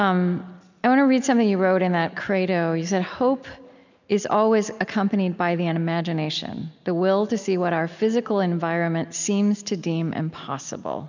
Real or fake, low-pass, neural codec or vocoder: real; 7.2 kHz; none